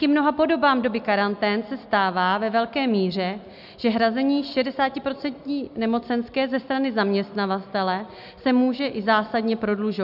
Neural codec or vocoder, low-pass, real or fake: none; 5.4 kHz; real